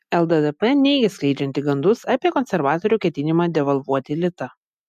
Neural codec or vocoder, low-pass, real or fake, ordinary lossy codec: none; 14.4 kHz; real; MP3, 96 kbps